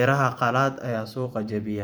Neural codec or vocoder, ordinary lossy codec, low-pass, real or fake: vocoder, 44.1 kHz, 128 mel bands every 512 samples, BigVGAN v2; none; none; fake